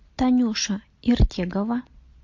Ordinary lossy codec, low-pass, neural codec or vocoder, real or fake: MP3, 48 kbps; 7.2 kHz; none; real